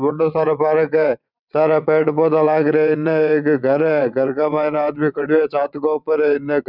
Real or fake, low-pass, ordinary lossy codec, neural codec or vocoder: fake; 5.4 kHz; none; vocoder, 22.05 kHz, 80 mel bands, WaveNeXt